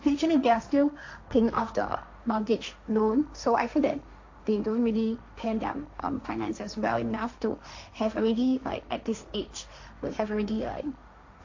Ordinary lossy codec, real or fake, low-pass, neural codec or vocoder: AAC, 48 kbps; fake; 7.2 kHz; codec, 16 kHz, 1.1 kbps, Voila-Tokenizer